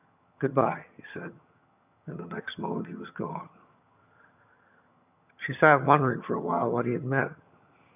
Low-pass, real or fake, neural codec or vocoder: 3.6 kHz; fake; vocoder, 22.05 kHz, 80 mel bands, HiFi-GAN